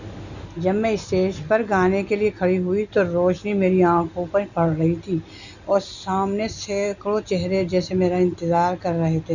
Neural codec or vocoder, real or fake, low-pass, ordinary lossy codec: none; real; 7.2 kHz; none